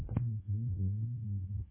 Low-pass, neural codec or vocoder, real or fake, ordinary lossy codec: 3.6 kHz; codec, 24 kHz, 1.2 kbps, DualCodec; fake; MP3, 16 kbps